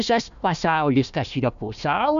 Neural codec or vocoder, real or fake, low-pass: codec, 16 kHz, 1 kbps, FunCodec, trained on Chinese and English, 50 frames a second; fake; 7.2 kHz